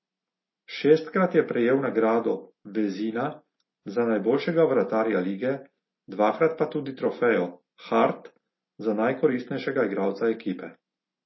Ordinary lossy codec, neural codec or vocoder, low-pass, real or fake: MP3, 24 kbps; none; 7.2 kHz; real